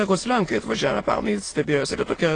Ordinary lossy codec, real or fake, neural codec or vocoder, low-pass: AAC, 32 kbps; fake; autoencoder, 22.05 kHz, a latent of 192 numbers a frame, VITS, trained on many speakers; 9.9 kHz